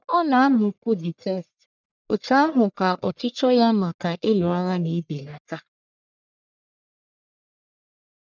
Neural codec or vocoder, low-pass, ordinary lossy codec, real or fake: codec, 44.1 kHz, 1.7 kbps, Pupu-Codec; 7.2 kHz; none; fake